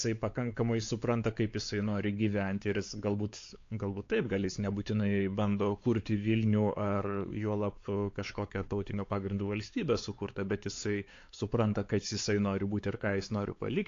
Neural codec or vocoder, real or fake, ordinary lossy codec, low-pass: codec, 16 kHz, 4 kbps, X-Codec, WavLM features, trained on Multilingual LibriSpeech; fake; AAC, 48 kbps; 7.2 kHz